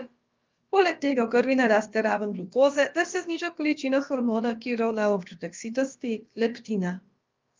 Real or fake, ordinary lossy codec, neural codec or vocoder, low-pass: fake; Opus, 24 kbps; codec, 16 kHz, about 1 kbps, DyCAST, with the encoder's durations; 7.2 kHz